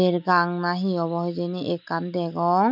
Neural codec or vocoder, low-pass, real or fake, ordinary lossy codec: none; 5.4 kHz; real; none